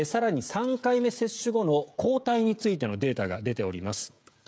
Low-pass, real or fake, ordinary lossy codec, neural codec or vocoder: none; fake; none; codec, 16 kHz, 16 kbps, FreqCodec, smaller model